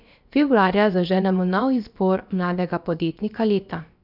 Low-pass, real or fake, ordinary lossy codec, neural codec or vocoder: 5.4 kHz; fake; none; codec, 16 kHz, about 1 kbps, DyCAST, with the encoder's durations